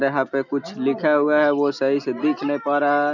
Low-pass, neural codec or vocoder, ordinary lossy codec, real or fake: 7.2 kHz; none; none; real